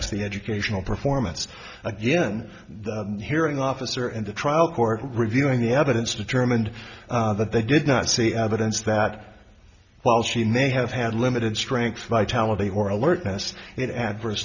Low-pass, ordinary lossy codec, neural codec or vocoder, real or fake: 7.2 kHz; Opus, 64 kbps; none; real